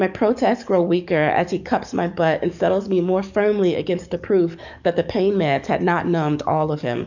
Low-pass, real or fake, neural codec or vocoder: 7.2 kHz; fake; codec, 44.1 kHz, 7.8 kbps, DAC